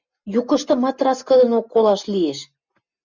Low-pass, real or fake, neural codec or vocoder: 7.2 kHz; real; none